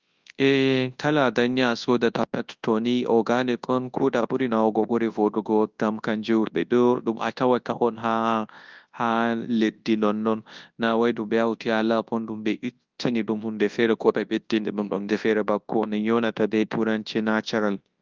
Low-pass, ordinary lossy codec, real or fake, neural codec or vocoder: 7.2 kHz; Opus, 32 kbps; fake; codec, 24 kHz, 0.9 kbps, WavTokenizer, large speech release